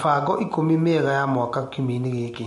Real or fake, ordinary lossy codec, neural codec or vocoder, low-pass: real; MP3, 48 kbps; none; 14.4 kHz